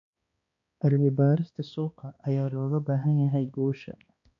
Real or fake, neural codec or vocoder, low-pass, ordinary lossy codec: fake; codec, 16 kHz, 2 kbps, X-Codec, HuBERT features, trained on balanced general audio; 7.2 kHz; MP3, 48 kbps